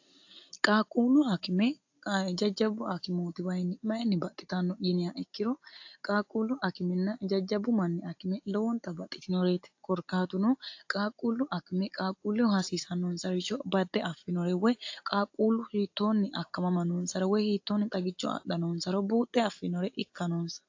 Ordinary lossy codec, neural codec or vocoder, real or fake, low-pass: AAC, 48 kbps; none; real; 7.2 kHz